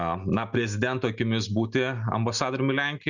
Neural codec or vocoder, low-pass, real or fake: none; 7.2 kHz; real